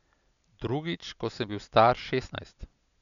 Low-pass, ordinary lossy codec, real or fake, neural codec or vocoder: 7.2 kHz; none; real; none